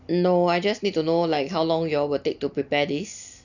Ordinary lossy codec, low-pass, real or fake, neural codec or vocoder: none; 7.2 kHz; real; none